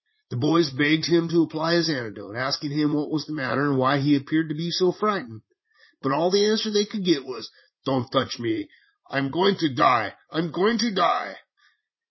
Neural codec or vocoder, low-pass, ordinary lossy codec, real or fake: vocoder, 44.1 kHz, 80 mel bands, Vocos; 7.2 kHz; MP3, 24 kbps; fake